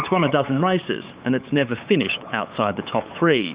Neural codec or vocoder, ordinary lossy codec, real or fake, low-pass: codec, 16 kHz, 8 kbps, FunCodec, trained on LibriTTS, 25 frames a second; Opus, 64 kbps; fake; 3.6 kHz